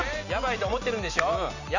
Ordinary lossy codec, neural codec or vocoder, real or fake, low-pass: none; none; real; 7.2 kHz